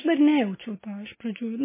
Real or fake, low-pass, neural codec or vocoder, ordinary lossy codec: real; 3.6 kHz; none; MP3, 16 kbps